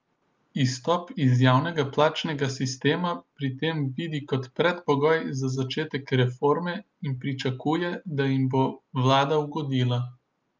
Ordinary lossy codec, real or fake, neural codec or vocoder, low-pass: Opus, 24 kbps; real; none; 7.2 kHz